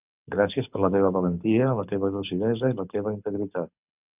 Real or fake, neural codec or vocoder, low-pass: fake; codec, 24 kHz, 6 kbps, HILCodec; 3.6 kHz